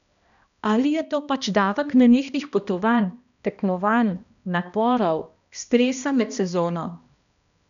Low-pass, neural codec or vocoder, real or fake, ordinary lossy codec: 7.2 kHz; codec, 16 kHz, 1 kbps, X-Codec, HuBERT features, trained on balanced general audio; fake; none